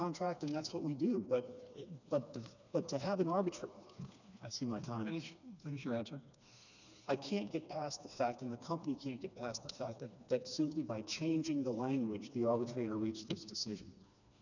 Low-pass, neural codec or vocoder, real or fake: 7.2 kHz; codec, 16 kHz, 2 kbps, FreqCodec, smaller model; fake